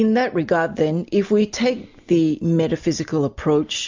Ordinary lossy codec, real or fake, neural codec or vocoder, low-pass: MP3, 64 kbps; real; none; 7.2 kHz